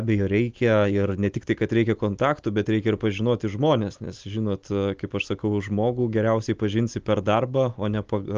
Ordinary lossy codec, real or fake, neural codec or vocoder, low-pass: Opus, 32 kbps; real; none; 7.2 kHz